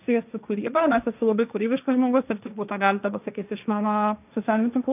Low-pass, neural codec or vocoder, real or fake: 3.6 kHz; codec, 16 kHz, 1.1 kbps, Voila-Tokenizer; fake